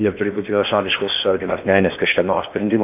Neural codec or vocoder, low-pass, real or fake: codec, 16 kHz in and 24 kHz out, 0.8 kbps, FocalCodec, streaming, 65536 codes; 3.6 kHz; fake